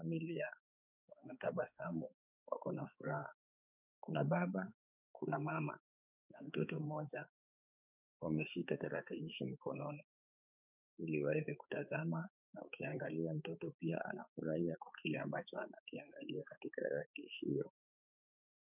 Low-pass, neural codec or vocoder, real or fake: 3.6 kHz; codec, 16 kHz, 4 kbps, X-Codec, HuBERT features, trained on balanced general audio; fake